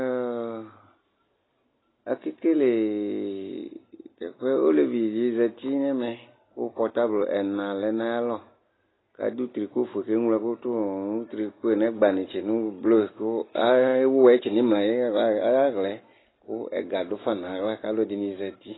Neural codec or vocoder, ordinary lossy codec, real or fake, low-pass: none; AAC, 16 kbps; real; 7.2 kHz